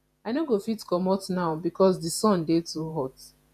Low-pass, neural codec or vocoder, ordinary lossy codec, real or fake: 14.4 kHz; vocoder, 48 kHz, 128 mel bands, Vocos; none; fake